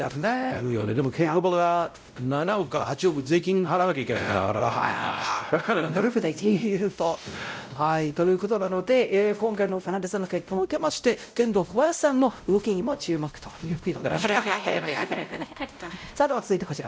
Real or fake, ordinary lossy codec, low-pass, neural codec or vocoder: fake; none; none; codec, 16 kHz, 0.5 kbps, X-Codec, WavLM features, trained on Multilingual LibriSpeech